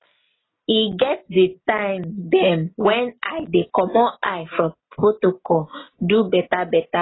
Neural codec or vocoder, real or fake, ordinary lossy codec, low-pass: none; real; AAC, 16 kbps; 7.2 kHz